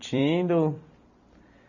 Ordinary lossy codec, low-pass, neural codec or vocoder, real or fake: none; 7.2 kHz; vocoder, 44.1 kHz, 128 mel bands every 256 samples, BigVGAN v2; fake